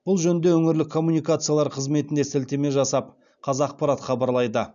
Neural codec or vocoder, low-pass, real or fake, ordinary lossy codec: none; 7.2 kHz; real; none